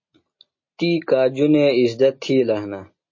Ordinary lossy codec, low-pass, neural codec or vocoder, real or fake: MP3, 32 kbps; 7.2 kHz; none; real